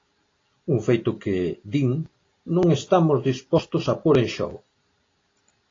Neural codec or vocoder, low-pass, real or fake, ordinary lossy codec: none; 7.2 kHz; real; AAC, 32 kbps